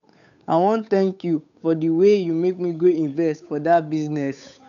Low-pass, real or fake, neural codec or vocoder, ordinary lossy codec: 7.2 kHz; fake; codec, 16 kHz, 8 kbps, FunCodec, trained on Chinese and English, 25 frames a second; none